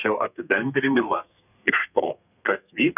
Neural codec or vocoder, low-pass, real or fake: codec, 32 kHz, 1.9 kbps, SNAC; 3.6 kHz; fake